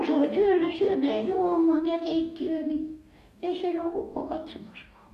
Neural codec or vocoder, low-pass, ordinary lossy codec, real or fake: codec, 44.1 kHz, 2.6 kbps, DAC; 14.4 kHz; none; fake